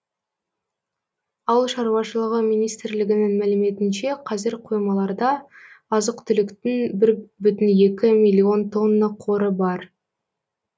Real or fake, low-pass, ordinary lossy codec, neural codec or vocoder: real; none; none; none